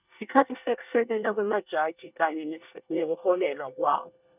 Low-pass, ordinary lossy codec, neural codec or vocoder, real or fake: 3.6 kHz; none; codec, 24 kHz, 1 kbps, SNAC; fake